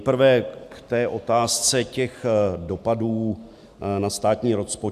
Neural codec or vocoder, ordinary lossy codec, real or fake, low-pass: none; AAC, 96 kbps; real; 14.4 kHz